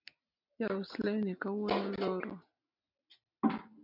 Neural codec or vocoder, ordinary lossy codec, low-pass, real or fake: none; MP3, 48 kbps; 5.4 kHz; real